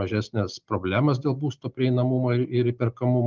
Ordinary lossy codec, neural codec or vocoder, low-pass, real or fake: Opus, 24 kbps; none; 7.2 kHz; real